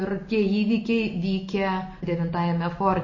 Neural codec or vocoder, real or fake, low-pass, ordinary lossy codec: none; real; 7.2 kHz; MP3, 32 kbps